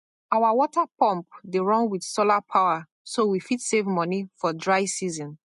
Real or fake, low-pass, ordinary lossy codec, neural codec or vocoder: real; 14.4 kHz; MP3, 48 kbps; none